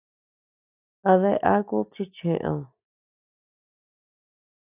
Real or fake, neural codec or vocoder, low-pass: real; none; 3.6 kHz